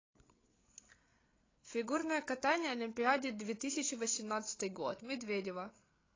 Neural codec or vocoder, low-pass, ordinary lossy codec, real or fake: codec, 16 kHz, 8 kbps, FreqCodec, larger model; 7.2 kHz; AAC, 32 kbps; fake